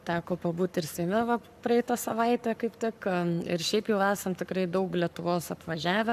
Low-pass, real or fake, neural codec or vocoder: 14.4 kHz; fake; codec, 44.1 kHz, 7.8 kbps, Pupu-Codec